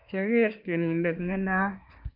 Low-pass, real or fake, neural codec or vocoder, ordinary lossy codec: 5.4 kHz; fake; codec, 24 kHz, 1 kbps, SNAC; none